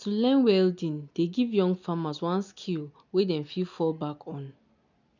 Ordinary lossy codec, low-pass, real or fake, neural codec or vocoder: none; 7.2 kHz; real; none